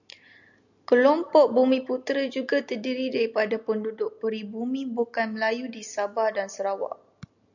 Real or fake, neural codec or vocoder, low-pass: real; none; 7.2 kHz